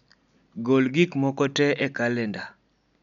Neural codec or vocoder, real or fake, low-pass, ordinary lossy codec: none; real; 7.2 kHz; none